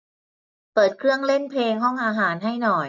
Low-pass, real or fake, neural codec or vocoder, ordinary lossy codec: 7.2 kHz; real; none; none